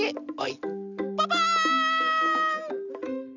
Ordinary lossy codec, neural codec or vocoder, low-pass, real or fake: none; none; 7.2 kHz; real